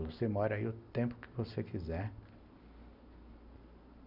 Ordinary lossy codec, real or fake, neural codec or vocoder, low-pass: none; real; none; 5.4 kHz